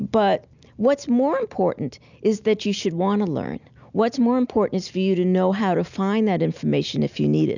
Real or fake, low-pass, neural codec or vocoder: real; 7.2 kHz; none